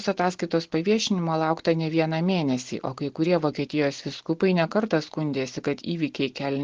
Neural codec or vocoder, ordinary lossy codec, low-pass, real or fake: none; Opus, 16 kbps; 7.2 kHz; real